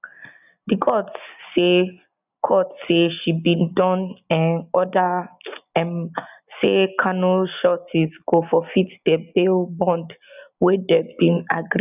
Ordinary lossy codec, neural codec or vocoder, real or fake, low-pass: none; none; real; 3.6 kHz